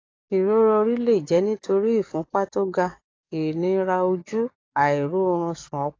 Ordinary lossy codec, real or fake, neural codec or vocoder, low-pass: AAC, 32 kbps; fake; codec, 44.1 kHz, 7.8 kbps, DAC; 7.2 kHz